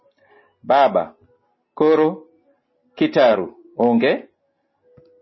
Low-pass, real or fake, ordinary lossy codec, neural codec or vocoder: 7.2 kHz; real; MP3, 24 kbps; none